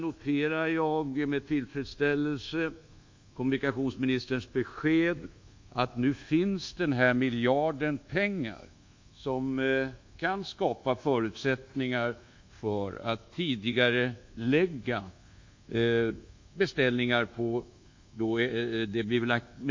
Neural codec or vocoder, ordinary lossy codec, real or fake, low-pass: codec, 24 kHz, 1.2 kbps, DualCodec; MP3, 48 kbps; fake; 7.2 kHz